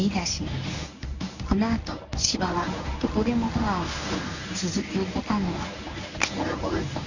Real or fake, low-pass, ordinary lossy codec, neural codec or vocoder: fake; 7.2 kHz; none; codec, 24 kHz, 0.9 kbps, WavTokenizer, medium speech release version 1